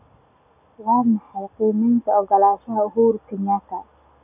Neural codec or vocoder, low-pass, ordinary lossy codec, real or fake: none; 3.6 kHz; none; real